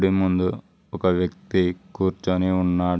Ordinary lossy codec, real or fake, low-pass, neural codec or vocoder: none; real; none; none